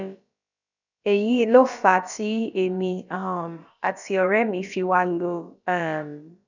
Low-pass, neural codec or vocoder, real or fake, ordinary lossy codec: 7.2 kHz; codec, 16 kHz, about 1 kbps, DyCAST, with the encoder's durations; fake; none